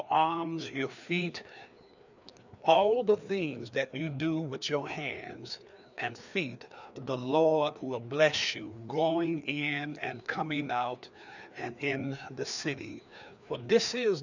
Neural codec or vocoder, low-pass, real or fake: codec, 16 kHz, 2 kbps, FreqCodec, larger model; 7.2 kHz; fake